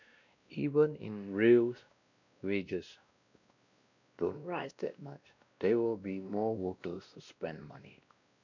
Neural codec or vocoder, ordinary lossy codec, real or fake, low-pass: codec, 16 kHz, 1 kbps, X-Codec, WavLM features, trained on Multilingual LibriSpeech; none; fake; 7.2 kHz